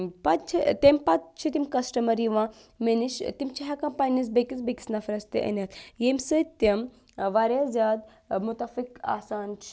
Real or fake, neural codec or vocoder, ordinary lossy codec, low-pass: real; none; none; none